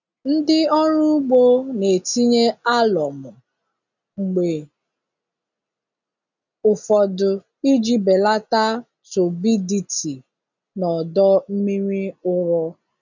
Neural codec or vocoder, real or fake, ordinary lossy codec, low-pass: none; real; none; 7.2 kHz